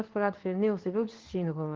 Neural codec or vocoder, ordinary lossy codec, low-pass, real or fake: codec, 24 kHz, 0.9 kbps, WavTokenizer, medium speech release version 2; Opus, 16 kbps; 7.2 kHz; fake